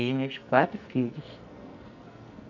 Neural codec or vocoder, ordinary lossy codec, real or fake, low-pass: codec, 44.1 kHz, 2.6 kbps, SNAC; none; fake; 7.2 kHz